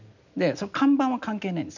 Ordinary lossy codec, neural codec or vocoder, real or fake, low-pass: none; none; real; 7.2 kHz